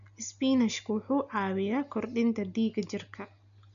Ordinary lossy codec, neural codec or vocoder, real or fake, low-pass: none; none; real; 7.2 kHz